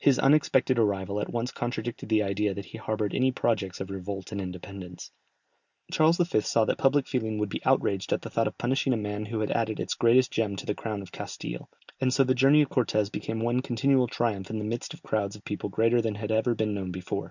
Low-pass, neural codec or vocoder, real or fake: 7.2 kHz; none; real